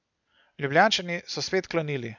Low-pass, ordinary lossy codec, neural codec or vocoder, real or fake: 7.2 kHz; none; none; real